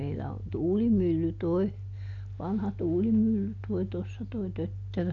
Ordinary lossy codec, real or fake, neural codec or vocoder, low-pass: none; real; none; 7.2 kHz